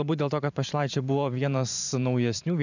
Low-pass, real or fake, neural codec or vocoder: 7.2 kHz; fake; vocoder, 44.1 kHz, 128 mel bands every 512 samples, BigVGAN v2